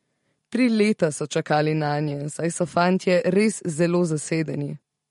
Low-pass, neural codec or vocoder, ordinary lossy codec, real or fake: 19.8 kHz; vocoder, 44.1 kHz, 128 mel bands every 512 samples, BigVGAN v2; MP3, 48 kbps; fake